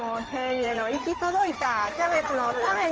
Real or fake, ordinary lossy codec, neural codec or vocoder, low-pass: fake; Opus, 24 kbps; codec, 16 kHz in and 24 kHz out, 2.2 kbps, FireRedTTS-2 codec; 7.2 kHz